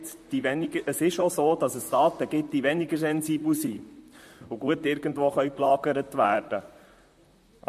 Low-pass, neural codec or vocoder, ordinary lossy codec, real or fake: 14.4 kHz; vocoder, 44.1 kHz, 128 mel bands, Pupu-Vocoder; MP3, 64 kbps; fake